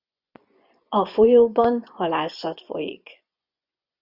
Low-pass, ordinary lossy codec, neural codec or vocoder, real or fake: 5.4 kHz; Opus, 64 kbps; vocoder, 24 kHz, 100 mel bands, Vocos; fake